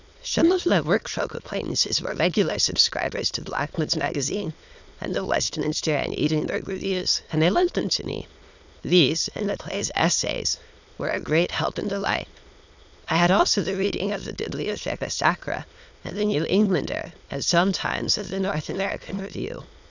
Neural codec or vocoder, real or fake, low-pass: autoencoder, 22.05 kHz, a latent of 192 numbers a frame, VITS, trained on many speakers; fake; 7.2 kHz